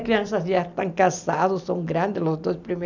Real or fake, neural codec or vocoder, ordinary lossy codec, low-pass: real; none; none; 7.2 kHz